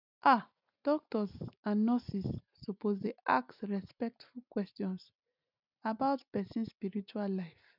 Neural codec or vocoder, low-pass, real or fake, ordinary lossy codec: none; 5.4 kHz; real; none